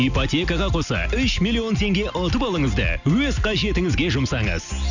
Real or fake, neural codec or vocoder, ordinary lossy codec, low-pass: real; none; none; 7.2 kHz